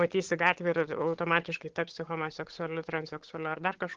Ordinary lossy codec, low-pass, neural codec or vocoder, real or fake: Opus, 16 kbps; 7.2 kHz; codec, 16 kHz, 8 kbps, FunCodec, trained on Chinese and English, 25 frames a second; fake